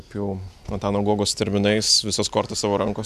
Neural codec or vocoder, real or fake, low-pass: none; real; 14.4 kHz